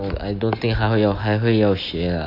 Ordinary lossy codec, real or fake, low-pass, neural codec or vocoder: AAC, 32 kbps; real; 5.4 kHz; none